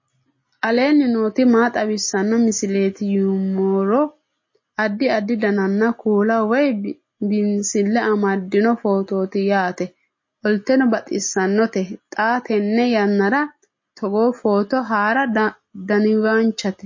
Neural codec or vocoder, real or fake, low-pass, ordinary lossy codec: none; real; 7.2 kHz; MP3, 32 kbps